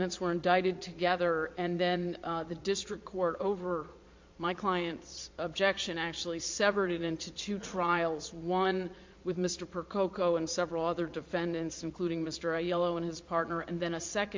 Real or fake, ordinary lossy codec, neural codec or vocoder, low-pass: fake; MP3, 48 kbps; vocoder, 22.05 kHz, 80 mel bands, Vocos; 7.2 kHz